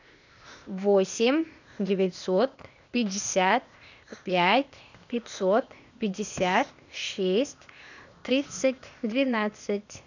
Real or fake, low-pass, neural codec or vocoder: fake; 7.2 kHz; codec, 16 kHz, 0.8 kbps, ZipCodec